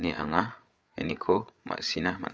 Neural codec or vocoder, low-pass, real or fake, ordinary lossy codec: codec, 16 kHz, 8 kbps, FreqCodec, larger model; none; fake; none